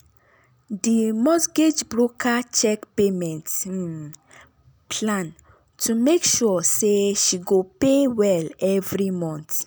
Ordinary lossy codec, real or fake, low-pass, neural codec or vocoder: none; real; none; none